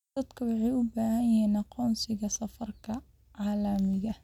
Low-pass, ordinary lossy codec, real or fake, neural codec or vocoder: 19.8 kHz; none; real; none